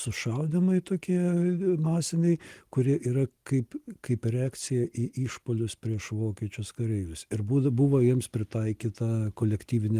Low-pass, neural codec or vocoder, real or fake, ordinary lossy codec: 14.4 kHz; none; real; Opus, 24 kbps